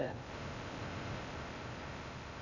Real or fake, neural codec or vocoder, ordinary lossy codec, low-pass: fake; codec, 16 kHz in and 24 kHz out, 0.6 kbps, FocalCodec, streaming, 4096 codes; AAC, 48 kbps; 7.2 kHz